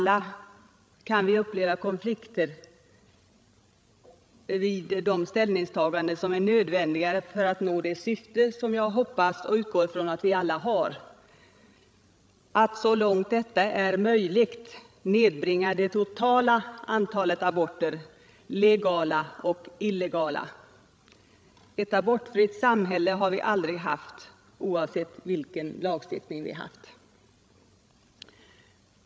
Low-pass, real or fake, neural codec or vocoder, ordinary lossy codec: none; fake; codec, 16 kHz, 16 kbps, FreqCodec, larger model; none